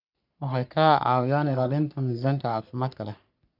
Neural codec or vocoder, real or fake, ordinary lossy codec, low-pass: codec, 44.1 kHz, 3.4 kbps, Pupu-Codec; fake; none; 5.4 kHz